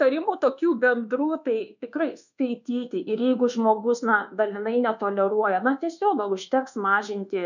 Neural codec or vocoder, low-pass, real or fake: codec, 24 kHz, 1.2 kbps, DualCodec; 7.2 kHz; fake